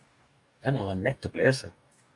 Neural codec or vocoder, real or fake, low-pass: codec, 44.1 kHz, 2.6 kbps, DAC; fake; 10.8 kHz